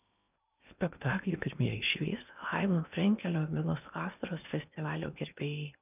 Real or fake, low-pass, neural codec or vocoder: fake; 3.6 kHz; codec, 16 kHz in and 24 kHz out, 0.8 kbps, FocalCodec, streaming, 65536 codes